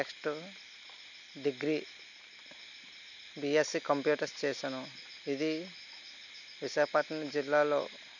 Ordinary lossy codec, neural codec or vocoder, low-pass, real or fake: none; none; 7.2 kHz; real